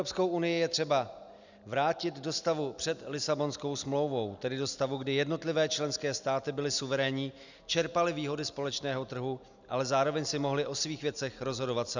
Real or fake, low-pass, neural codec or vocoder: real; 7.2 kHz; none